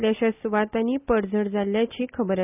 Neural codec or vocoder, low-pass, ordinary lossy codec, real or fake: none; 3.6 kHz; none; real